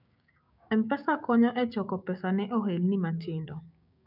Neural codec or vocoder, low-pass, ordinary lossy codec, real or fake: codec, 16 kHz, 6 kbps, DAC; 5.4 kHz; none; fake